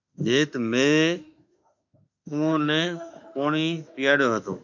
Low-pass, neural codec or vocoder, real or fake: 7.2 kHz; autoencoder, 48 kHz, 32 numbers a frame, DAC-VAE, trained on Japanese speech; fake